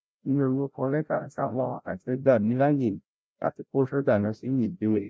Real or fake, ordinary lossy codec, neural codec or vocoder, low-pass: fake; none; codec, 16 kHz, 0.5 kbps, FreqCodec, larger model; none